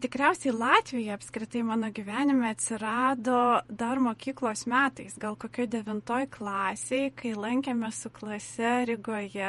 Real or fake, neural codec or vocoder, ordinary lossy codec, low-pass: fake; vocoder, 48 kHz, 128 mel bands, Vocos; MP3, 48 kbps; 19.8 kHz